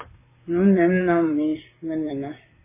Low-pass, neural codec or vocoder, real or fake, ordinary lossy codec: 3.6 kHz; vocoder, 44.1 kHz, 128 mel bands, Pupu-Vocoder; fake; MP3, 16 kbps